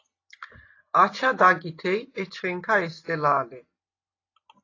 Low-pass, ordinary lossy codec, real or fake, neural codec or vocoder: 7.2 kHz; AAC, 32 kbps; real; none